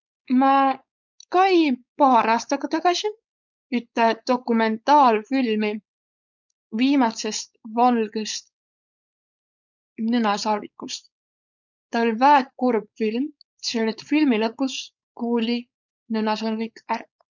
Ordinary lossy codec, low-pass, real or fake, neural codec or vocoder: none; 7.2 kHz; fake; codec, 16 kHz, 4.8 kbps, FACodec